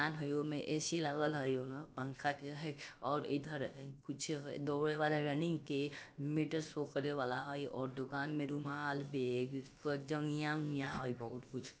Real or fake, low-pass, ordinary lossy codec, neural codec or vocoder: fake; none; none; codec, 16 kHz, about 1 kbps, DyCAST, with the encoder's durations